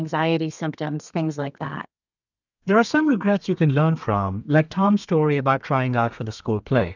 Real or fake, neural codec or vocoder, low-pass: fake; codec, 32 kHz, 1.9 kbps, SNAC; 7.2 kHz